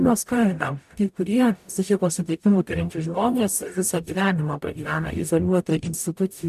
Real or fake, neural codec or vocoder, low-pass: fake; codec, 44.1 kHz, 0.9 kbps, DAC; 14.4 kHz